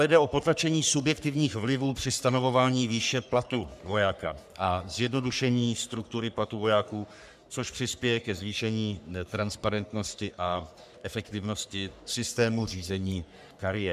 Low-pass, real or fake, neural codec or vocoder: 14.4 kHz; fake; codec, 44.1 kHz, 3.4 kbps, Pupu-Codec